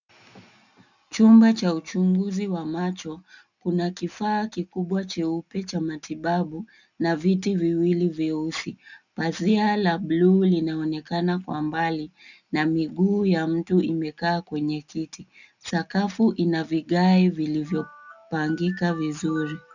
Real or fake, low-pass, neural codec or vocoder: real; 7.2 kHz; none